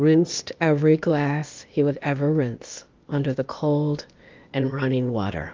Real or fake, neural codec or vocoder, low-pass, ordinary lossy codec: fake; codec, 16 kHz, 0.8 kbps, ZipCodec; 7.2 kHz; Opus, 24 kbps